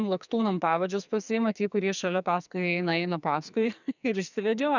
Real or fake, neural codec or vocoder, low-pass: fake; codec, 44.1 kHz, 2.6 kbps, SNAC; 7.2 kHz